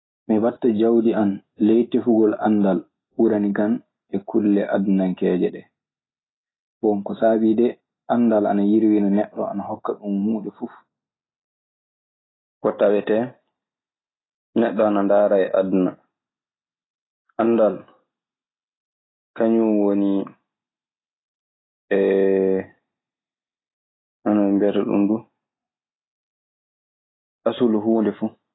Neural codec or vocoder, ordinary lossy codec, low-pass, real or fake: none; AAC, 16 kbps; 7.2 kHz; real